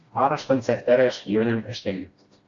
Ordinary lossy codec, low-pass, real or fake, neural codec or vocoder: Opus, 64 kbps; 7.2 kHz; fake; codec, 16 kHz, 1 kbps, FreqCodec, smaller model